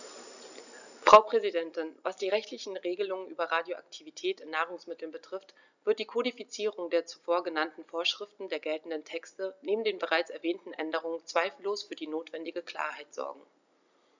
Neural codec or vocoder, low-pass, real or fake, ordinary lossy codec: none; 7.2 kHz; real; none